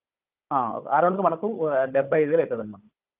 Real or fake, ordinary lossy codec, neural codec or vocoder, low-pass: fake; Opus, 32 kbps; codec, 16 kHz, 16 kbps, FunCodec, trained on Chinese and English, 50 frames a second; 3.6 kHz